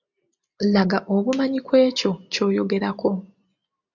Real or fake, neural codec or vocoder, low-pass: real; none; 7.2 kHz